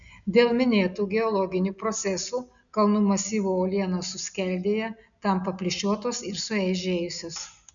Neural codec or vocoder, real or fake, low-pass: none; real; 7.2 kHz